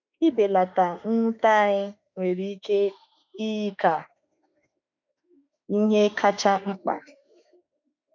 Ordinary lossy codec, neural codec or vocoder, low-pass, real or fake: none; autoencoder, 48 kHz, 32 numbers a frame, DAC-VAE, trained on Japanese speech; 7.2 kHz; fake